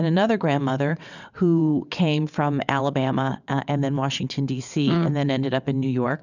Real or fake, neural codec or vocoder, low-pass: fake; vocoder, 22.05 kHz, 80 mel bands, WaveNeXt; 7.2 kHz